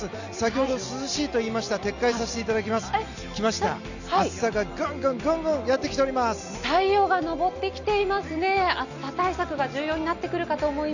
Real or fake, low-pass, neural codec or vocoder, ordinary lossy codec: real; 7.2 kHz; none; none